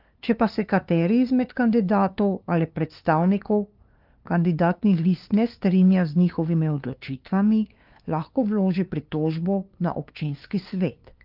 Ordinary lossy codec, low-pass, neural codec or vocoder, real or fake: Opus, 24 kbps; 5.4 kHz; codec, 16 kHz, 2 kbps, FunCodec, trained on LibriTTS, 25 frames a second; fake